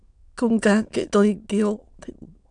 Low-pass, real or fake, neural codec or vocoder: 9.9 kHz; fake; autoencoder, 22.05 kHz, a latent of 192 numbers a frame, VITS, trained on many speakers